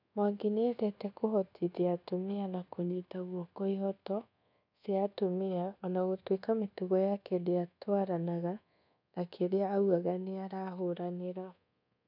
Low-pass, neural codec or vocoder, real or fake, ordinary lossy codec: 5.4 kHz; codec, 24 kHz, 1.2 kbps, DualCodec; fake; none